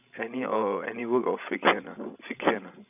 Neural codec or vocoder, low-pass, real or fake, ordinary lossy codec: codec, 16 kHz, 16 kbps, FreqCodec, larger model; 3.6 kHz; fake; none